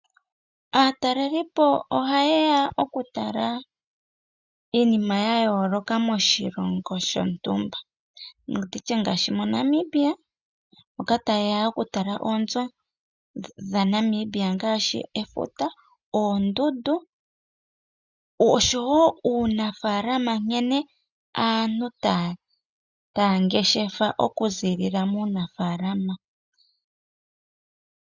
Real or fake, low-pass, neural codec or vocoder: real; 7.2 kHz; none